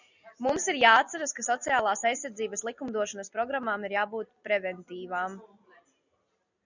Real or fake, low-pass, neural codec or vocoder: real; 7.2 kHz; none